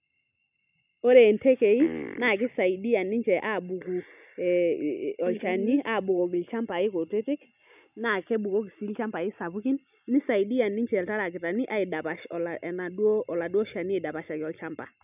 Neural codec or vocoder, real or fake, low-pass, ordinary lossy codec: none; real; 3.6 kHz; none